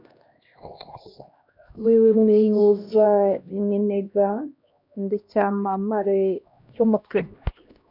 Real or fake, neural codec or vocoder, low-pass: fake; codec, 16 kHz, 1 kbps, X-Codec, HuBERT features, trained on LibriSpeech; 5.4 kHz